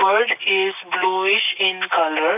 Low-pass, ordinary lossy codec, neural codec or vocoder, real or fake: 3.6 kHz; none; none; real